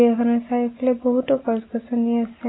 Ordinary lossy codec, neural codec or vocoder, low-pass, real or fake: AAC, 16 kbps; none; 7.2 kHz; real